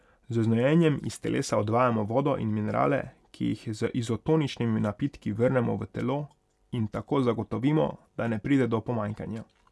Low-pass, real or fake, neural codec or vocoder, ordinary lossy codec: none; real; none; none